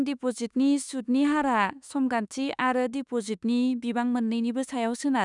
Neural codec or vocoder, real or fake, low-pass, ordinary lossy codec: autoencoder, 48 kHz, 32 numbers a frame, DAC-VAE, trained on Japanese speech; fake; 10.8 kHz; none